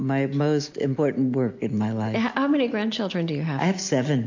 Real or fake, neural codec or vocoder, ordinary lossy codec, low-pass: real; none; MP3, 48 kbps; 7.2 kHz